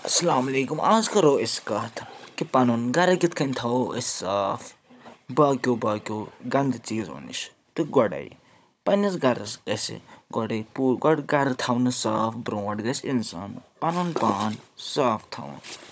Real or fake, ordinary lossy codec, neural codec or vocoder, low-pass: fake; none; codec, 16 kHz, 16 kbps, FunCodec, trained on Chinese and English, 50 frames a second; none